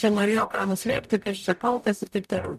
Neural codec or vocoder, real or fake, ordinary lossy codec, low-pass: codec, 44.1 kHz, 0.9 kbps, DAC; fake; MP3, 96 kbps; 14.4 kHz